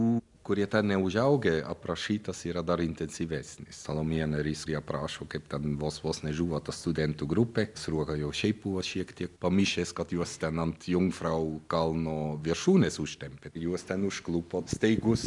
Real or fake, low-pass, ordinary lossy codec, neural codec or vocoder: real; 10.8 kHz; MP3, 96 kbps; none